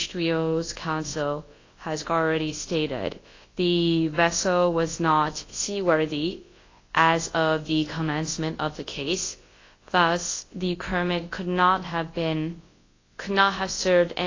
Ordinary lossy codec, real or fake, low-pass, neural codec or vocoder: AAC, 32 kbps; fake; 7.2 kHz; codec, 24 kHz, 0.9 kbps, WavTokenizer, large speech release